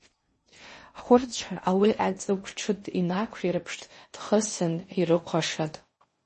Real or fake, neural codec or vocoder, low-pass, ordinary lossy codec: fake; codec, 16 kHz in and 24 kHz out, 0.6 kbps, FocalCodec, streaming, 2048 codes; 10.8 kHz; MP3, 32 kbps